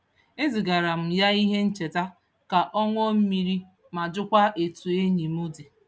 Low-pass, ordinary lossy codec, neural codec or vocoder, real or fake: none; none; none; real